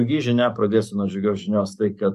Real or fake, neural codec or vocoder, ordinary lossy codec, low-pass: fake; codec, 44.1 kHz, 7.8 kbps, DAC; MP3, 96 kbps; 14.4 kHz